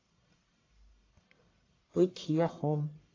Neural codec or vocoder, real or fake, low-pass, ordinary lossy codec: codec, 44.1 kHz, 1.7 kbps, Pupu-Codec; fake; 7.2 kHz; AAC, 32 kbps